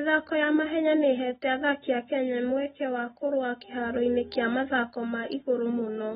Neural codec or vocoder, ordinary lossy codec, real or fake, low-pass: none; AAC, 16 kbps; real; 19.8 kHz